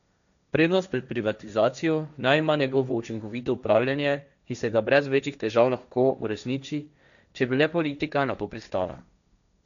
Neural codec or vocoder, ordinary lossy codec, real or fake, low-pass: codec, 16 kHz, 1.1 kbps, Voila-Tokenizer; none; fake; 7.2 kHz